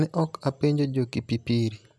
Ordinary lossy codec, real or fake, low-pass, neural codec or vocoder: none; real; none; none